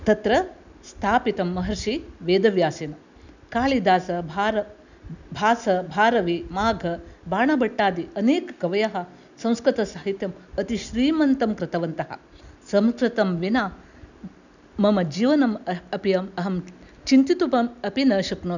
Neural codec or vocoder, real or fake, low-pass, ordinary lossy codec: none; real; 7.2 kHz; none